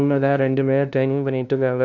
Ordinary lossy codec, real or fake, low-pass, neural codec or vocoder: none; fake; 7.2 kHz; codec, 16 kHz, 0.5 kbps, FunCodec, trained on LibriTTS, 25 frames a second